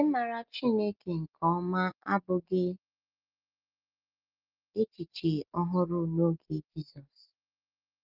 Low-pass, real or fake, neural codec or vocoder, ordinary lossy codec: 5.4 kHz; real; none; Opus, 24 kbps